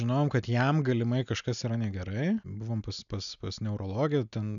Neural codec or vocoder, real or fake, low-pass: none; real; 7.2 kHz